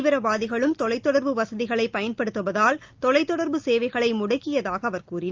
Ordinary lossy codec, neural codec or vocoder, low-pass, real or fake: Opus, 24 kbps; none; 7.2 kHz; real